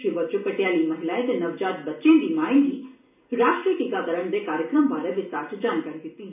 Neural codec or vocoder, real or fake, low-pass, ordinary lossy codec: none; real; 3.6 kHz; none